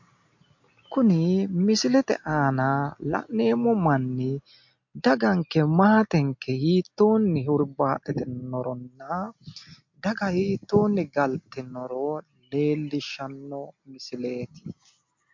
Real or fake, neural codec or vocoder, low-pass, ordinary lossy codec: real; none; 7.2 kHz; MP3, 48 kbps